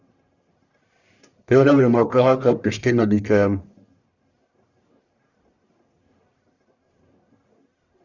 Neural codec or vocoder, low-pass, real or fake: codec, 44.1 kHz, 1.7 kbps, Pupu-Codec; 7.2 kHz; fake